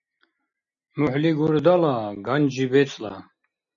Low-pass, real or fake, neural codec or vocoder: 7.2 kHz; real; none